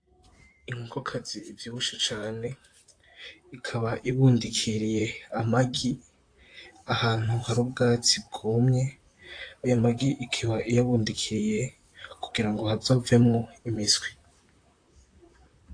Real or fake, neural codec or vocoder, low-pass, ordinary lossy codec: fake; vocoder, 44.1 kHz, 128 mel bands, Pupu-Vocoder; 9.9 kHz; AAC, 48 kbps